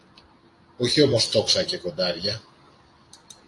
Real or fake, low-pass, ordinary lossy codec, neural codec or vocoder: fake; 10.8 kHz; AAC, 48 kbps; vocoder, 44.1 kHz, 128 mel bands every 256 samples, BigVGAN v2